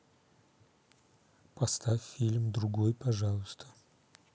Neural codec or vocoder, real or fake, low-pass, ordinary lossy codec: none; real; none; none